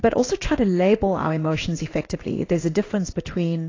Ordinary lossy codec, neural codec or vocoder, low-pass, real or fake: AAC, 32 kbps; codec, 16 kHz in and 24 kHz out, 1 kbps, XY-Tokenizer; 7.2 kHz; fake